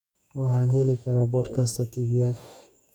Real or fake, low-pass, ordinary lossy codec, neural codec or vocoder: fake; 19.8 kHz; none; codec, 44.1 kHz, 2.6 kbps, DAC